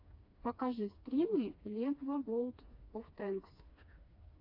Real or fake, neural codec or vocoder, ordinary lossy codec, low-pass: fake; codec, 16 kHz, 2 kbps, FreqCodec, smaller model; AAC, 32 kbps; 5.4 kHz